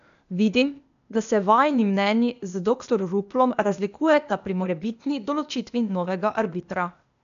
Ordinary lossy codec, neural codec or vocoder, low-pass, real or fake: MP3, 96 kbps; codec, 16 kHz, 0.8 kbps, ZipCodec; 7.2 kHz; fake